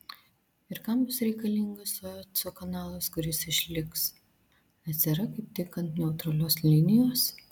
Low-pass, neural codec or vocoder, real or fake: 19.8 kHz; none; real